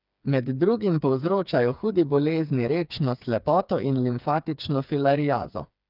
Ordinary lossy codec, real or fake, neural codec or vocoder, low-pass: none; fake; codec, 16 kHz, 4 kbps, FreqCodec, smaller model; 5.4 kHz